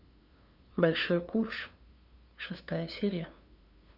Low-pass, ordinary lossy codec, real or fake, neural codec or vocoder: 5.4 kHz; Opus, 64 kbps; fake; codec, 16 kHz, 2 kbps, FunCodec, trained on LibriTTS, 25 frames a second